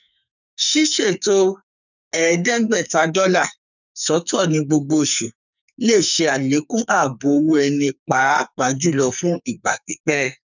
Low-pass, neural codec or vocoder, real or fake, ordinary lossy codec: 7.2 kHz; codec, 44.1 kHz, 2.6 kbps, SNAC; fake; none